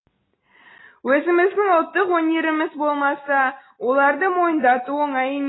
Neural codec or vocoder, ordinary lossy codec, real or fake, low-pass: none; AAC, 16 kbps; real; 7.2 kHz